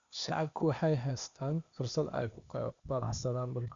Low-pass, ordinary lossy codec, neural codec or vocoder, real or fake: 7.2 kHz; Opus, 64 kbps; codec, 16 kHz, 0.8 kbps, ZipCodec; fake